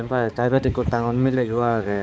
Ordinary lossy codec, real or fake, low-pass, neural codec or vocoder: none; fake; none; codec, 16 kHz, 4 kbps, X-Codec, HuBERT features, trained on balanced general audio